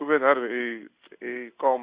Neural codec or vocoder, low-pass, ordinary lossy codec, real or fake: codec, 16 kHz in and 24 kHz out, 1 kbps, XY-Tokenizer; 3.6 kHz; Opus, 64 kbps; fake